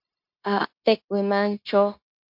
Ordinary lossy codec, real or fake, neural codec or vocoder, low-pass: MP3, 48 kbps; fake; codec, 16 kHz, 0.9 kbps, LongCat-Audio-Codec; 5.4 kHz